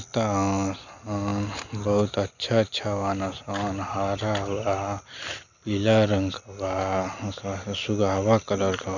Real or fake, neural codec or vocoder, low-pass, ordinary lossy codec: real; none; 7.2 kHz; none